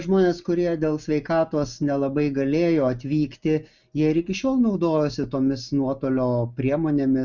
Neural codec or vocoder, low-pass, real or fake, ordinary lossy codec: none; 7.2 kHz; real; Opus, 64 kbps